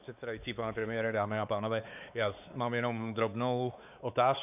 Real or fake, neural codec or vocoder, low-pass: fake; codec, 16 kHz, 4 kbps, X-Codec, WavLM features, trained on Multilingual LibriSpeech; 3.6 kHz